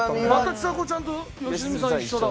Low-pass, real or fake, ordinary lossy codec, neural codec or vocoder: none; real; none; none